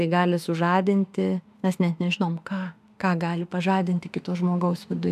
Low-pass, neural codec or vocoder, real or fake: 14.4 kHz; autoencoder, 48 kHz, 32 numbers a frame, DAC-VAE, trained on Japanese speech; fake